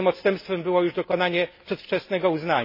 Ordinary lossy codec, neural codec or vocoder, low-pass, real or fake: MP3, 24 kbps; none; 5.4 kHz; real